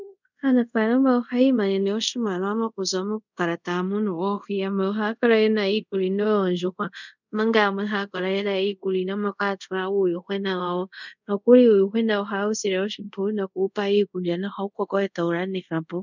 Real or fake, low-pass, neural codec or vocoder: fake; 7.2 kHz; codec, 24 kHz, 0.5 kbps, DualCodec